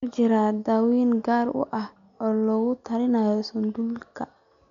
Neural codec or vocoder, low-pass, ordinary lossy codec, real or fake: none; 7.2 kHz; MP3, 64 kbps; real